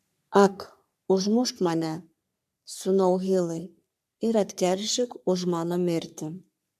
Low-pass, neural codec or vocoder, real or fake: 14.4 kHz; codec, 44.1 kHz, 3.4 kbps, Pupu-Codec; fake